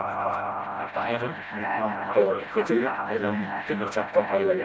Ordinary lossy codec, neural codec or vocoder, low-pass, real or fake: none; codec, 16 kHz, 0.5 kbps, FreqCodec, smaller model; none; fake